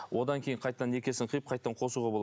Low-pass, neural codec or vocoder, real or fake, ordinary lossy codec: none; none; real; none